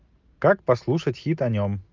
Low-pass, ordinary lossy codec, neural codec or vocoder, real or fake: 7.2 kHz; Opus, 32 kbps; none; real